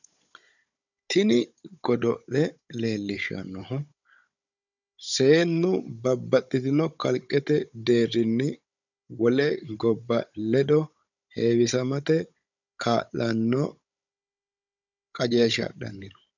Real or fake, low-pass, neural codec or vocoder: fake; 7.2 kHz; codec, 16 kHz, 16 kbps, FunCodec, trained on Chinese and English, 50 frames a second